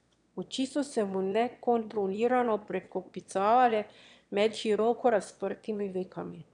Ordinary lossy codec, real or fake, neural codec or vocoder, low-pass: none; fake; autoencoder, 22.05 kHz, a latent of 192 numbers a frame, VITS, trained on one speaker; 9.9 kHz